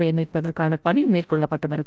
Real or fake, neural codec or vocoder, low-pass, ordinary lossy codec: fake; codec, 16 kHz, 0.5 kbps, FreqCodec, larger model; none; none